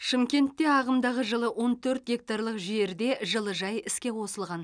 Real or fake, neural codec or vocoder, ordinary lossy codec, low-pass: real; none; none; 9.9 kHz